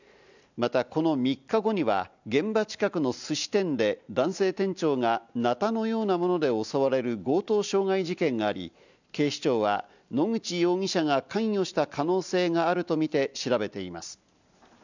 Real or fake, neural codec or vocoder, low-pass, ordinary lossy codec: real; none; 7.2 kHz; none